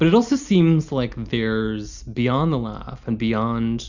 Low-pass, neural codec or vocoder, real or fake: 7.2 kHz; none; real